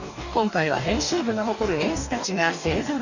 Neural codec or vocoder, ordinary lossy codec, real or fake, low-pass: codec, 44.1 kHz, 2.6 kbps, DAC; none; fake; 7.2 kHz